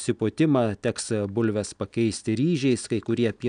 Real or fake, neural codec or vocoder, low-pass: real; none; 9.9 kHz